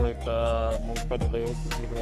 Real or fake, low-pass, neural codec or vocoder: fake; 14.4 kHz; codec, 44.1 kHz, 3.4 kbps, Pupu-Codec